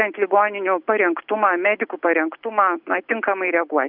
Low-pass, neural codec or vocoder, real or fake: 5.4 kHz; none; real